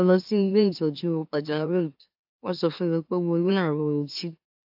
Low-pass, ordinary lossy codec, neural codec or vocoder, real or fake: 5.4 kHz; AAC, 48 kbps; autoencoder, 44.1 kHz, a latent of 192 numbers a frame, MeloTTS; fake